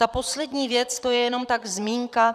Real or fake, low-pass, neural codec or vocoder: fake; 14.4 kHz; vocoder, 44.1 kHz, 128 mel bands, Pupu-Vocoder